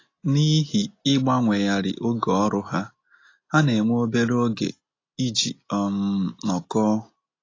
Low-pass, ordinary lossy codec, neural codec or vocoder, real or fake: 7.2 kHz; AAC, 32 kbps; none; real